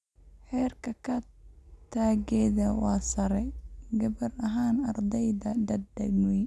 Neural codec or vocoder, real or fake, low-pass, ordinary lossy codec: none; real; none; none